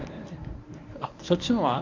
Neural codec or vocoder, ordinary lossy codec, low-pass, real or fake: codec, 24 kHz, 0.9 kbps, WavTokenizer, medium speech release version 1; MP3, 64 kbps; 7.2 kHz; fake